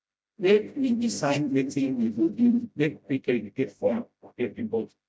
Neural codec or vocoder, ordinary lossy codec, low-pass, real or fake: codec, 16 kHz, 0.5 kbps, FreqCodec, smaller model; none; none; fake